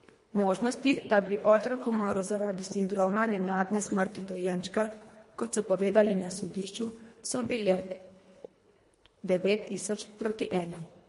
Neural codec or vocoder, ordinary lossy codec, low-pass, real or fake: codec, 24 kHz, 1.5 kbps, HILCodec; MP3, 48 kbps; 10.8 kHz; fake